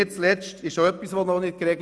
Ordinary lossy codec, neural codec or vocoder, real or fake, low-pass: none; none; real; none